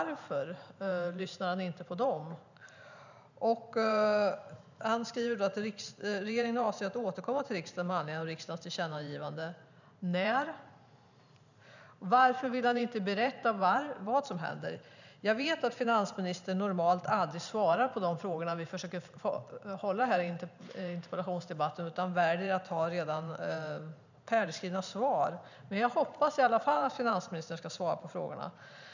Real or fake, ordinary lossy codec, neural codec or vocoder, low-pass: fake; none; vocoder, 44.1 kHz, 128 mel bands every 512 samples, BigVGAN v2; 7.2 kHz